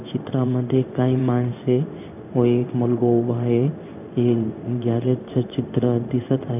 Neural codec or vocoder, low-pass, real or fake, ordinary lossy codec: codec, 16 kHz in and 24 kHz out, 1 kbps, XY-Tokenizer; 3.6 kHz; fake; none